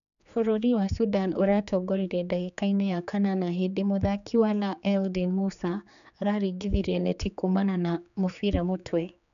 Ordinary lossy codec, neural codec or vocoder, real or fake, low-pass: none; codec, 16 kHz, 4 kbps, X-Codec, HuBERT features, trained on general audio; fake; 7.2 kHz